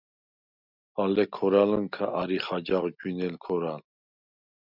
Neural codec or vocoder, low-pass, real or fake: none; 5.4 kHz; real